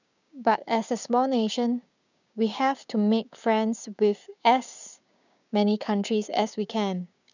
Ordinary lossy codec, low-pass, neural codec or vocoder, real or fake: none; 7.2 kHz; codec, 16 kHz, 6 kbps, DAC; fake